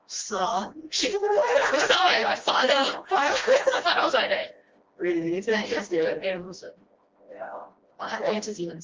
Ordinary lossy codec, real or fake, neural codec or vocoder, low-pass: Opus, 32 kbps; fake; codec, 16 kHz, 1 kbps, FreqCodec, smaller model; 7.2 kHz